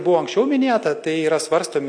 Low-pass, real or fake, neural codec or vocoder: 9.9 kHz; real; none